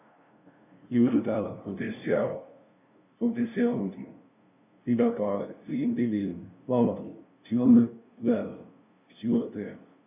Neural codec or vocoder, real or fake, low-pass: codec, 16 kHz, 0.5 kbps, FunCodec, trained on LibriTTS, 25 frames a second; fake; 3.6 kHz